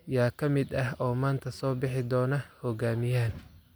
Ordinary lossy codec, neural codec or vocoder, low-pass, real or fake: none; none; none; real